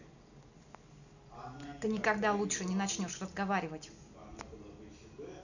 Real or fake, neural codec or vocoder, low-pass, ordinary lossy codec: real; none; 7.2 kHz; none